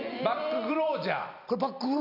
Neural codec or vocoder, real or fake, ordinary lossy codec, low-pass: vocoder, 44.1 kHz, 128 mel bands every 256 samples, BigVGAN v2; fake; none; 5.4 kHz